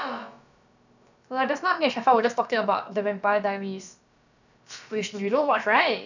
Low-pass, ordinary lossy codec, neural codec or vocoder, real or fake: 7.2 kHz; none; codec, 16 kHz, about 1 kbps, DyCAST, with the encoder's durations; fake